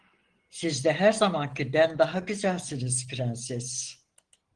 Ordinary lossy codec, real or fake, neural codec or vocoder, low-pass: Opus, 16 kbps; real; none; 9.9 kHz